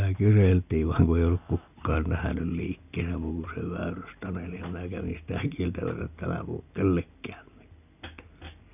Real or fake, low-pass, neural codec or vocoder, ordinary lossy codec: real; 3.6 kHz; none; none